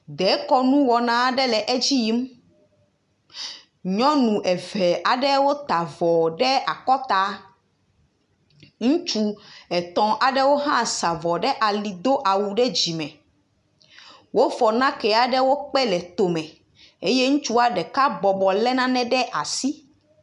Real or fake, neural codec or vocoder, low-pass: real; none; 10.8 kHz